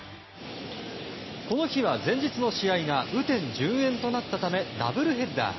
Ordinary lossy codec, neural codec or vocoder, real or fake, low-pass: MP3, 24 kbps; none; real; 7.2 kHz